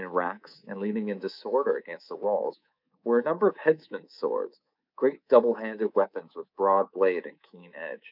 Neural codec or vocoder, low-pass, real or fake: codec, 24 kHz, 3.1 kbps, DualCodec; 5.4 kHz; fake